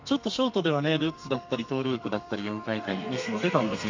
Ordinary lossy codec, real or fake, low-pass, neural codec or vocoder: MP3, 48 kbps; fake; 7.2 kHz; codec, 32 kHz, 1.9 kbps, SNAC